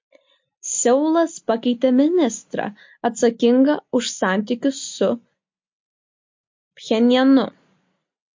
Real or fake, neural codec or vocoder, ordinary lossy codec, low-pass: real; none; MP3, 48 kbps; 7.2 kHz